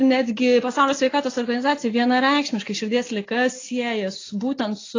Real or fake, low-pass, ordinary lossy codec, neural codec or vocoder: real; 7.2 kHz; AAC, 32 kbps; none